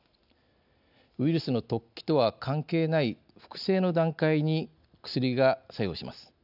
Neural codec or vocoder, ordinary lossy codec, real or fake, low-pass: none; none; real; 5.4 kHz